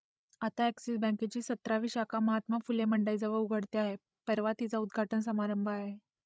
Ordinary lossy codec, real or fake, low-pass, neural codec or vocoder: none; fake; none; codec, 16 kHz, 16 kbps, FreqCodec, larger model